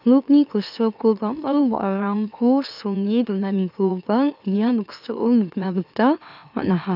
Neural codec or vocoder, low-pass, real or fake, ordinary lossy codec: autoencoder, 44.1 kHz, a latent of 192 numbers a frame, MeloTTS; 5.4 kHz; fake; none